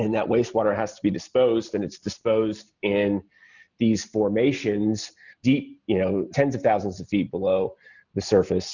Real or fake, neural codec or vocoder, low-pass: real; none; 7.2 kHz